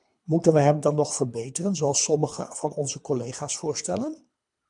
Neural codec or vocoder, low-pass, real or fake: codec, 24 kHz, 3 kbps, HILCodec; 10.8 kHz; fake